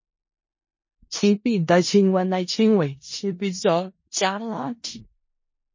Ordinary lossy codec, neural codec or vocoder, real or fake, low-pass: MP3, 32 kbps; codec, 16 kHz in and 24 kHz out, 0.4 kbps, LongCat-Audio-Codec, four codebook decoder; fake; 7.2 kHz